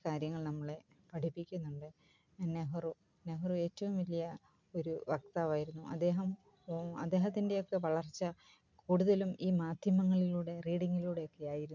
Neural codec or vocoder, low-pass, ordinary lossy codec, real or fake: none; 7.2 kHz; none; real